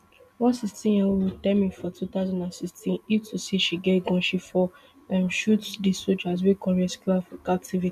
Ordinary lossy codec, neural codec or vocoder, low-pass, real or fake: none; none; 14.4 kHz; real